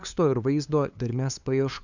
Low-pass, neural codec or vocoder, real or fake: 7.2 kHz; codec, 16 kHz, 2 kbps, FunCodec, trained on LibriTTS, 25 frames a second; fake